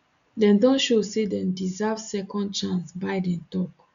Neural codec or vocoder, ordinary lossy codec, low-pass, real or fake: none; none; 7.2 kHz; real